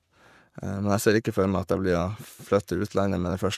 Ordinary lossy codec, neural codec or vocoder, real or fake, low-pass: none; codec, 44.1 kHz, 7.8 kbps, Pupu-Codec; fake; 14.4 kHz